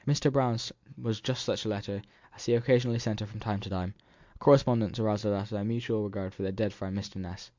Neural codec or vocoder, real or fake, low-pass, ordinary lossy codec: none; real; 7.2 kHz; MP3, 64 kbps